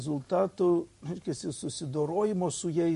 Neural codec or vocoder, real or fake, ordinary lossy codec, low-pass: vocoder, 44.1 kHz, 128 mel bands every 512 samples, BigVGAN v2; fake; MP3, 48 kbps; 14.4 kHz